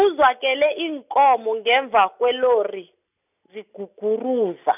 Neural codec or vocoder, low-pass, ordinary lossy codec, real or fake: none; 3.6 kHz; none; real